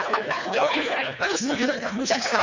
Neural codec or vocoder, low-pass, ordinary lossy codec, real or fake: codec, 24 kHz, 1.5 kbps, HILCodec; 7.2 kHz; MP3, 48 kbps; fake